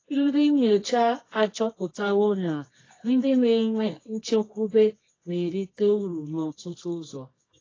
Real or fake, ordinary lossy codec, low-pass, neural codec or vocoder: fake; AAC, 32 kbps; 7.2 kHz; codec, 24 kHz, 0.9 kbps, WavTokenizer, medium music audio release